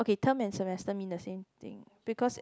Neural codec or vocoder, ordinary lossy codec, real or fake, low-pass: none; none; real; none